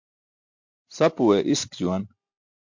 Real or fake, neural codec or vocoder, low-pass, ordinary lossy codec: real; none; 7.2 kHz; MP3, 48 kbps